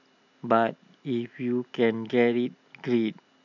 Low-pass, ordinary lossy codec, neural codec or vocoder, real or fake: 7.2 kHz; none; none; real